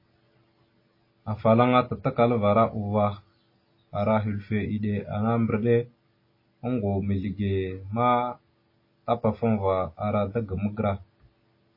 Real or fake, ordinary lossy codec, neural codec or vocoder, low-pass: real; MP3, 24 kbps; none; 5.4 kHz